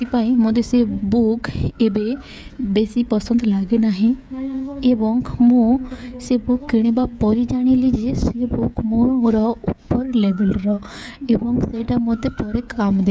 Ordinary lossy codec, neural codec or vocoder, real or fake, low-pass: none; codec, 16 kHz, 16 kbps, FreqCodec, smaller model; fake; none